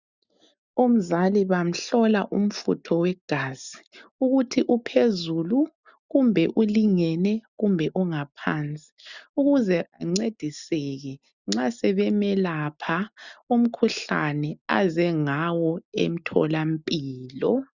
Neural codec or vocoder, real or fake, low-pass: none; real; 7.2 kHz